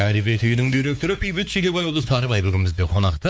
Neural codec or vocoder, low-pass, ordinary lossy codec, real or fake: codec, 16 kHz, 4 kbps, X-Codec, WavLM features, trained on Multilingual LibriSpeech; none; none; fake